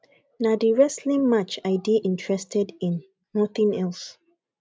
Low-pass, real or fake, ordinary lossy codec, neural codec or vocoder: none; real; none; none